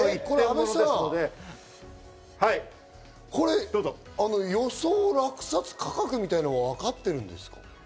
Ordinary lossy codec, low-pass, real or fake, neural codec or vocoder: none; none; real; none